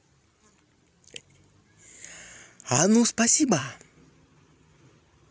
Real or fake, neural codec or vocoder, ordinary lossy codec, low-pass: real; none; none; none